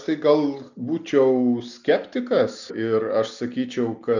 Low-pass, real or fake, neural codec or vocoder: 7.2 kHz; real; none